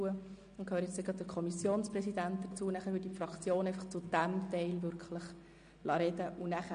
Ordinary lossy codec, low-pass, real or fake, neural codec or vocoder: MP3, 48 kbps; 9.9 kHz; real; none